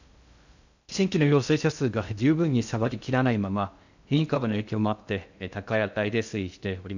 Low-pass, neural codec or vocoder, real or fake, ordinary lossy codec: 7.2 kHz; codec, 16 kHz in and 24 kHz out, 0.6 kbps, FocalCodec, streaming, 2048 codes; fake; none